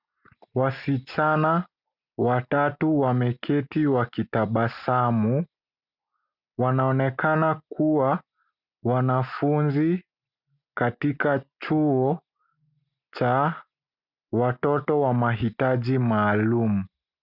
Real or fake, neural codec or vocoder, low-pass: real; none; 5.4 kHz